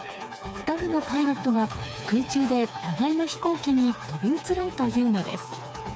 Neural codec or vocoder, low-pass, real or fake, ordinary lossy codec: codec, 16 kHz, 4 kbps, FreqCodec, smaller model; none; fake; none